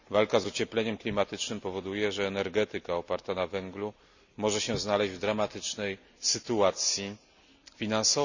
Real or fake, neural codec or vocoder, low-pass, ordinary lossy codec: real; none; 7.2 kHz; none